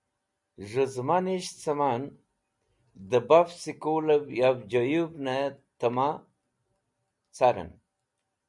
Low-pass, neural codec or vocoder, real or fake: 10.8 kHz; none; real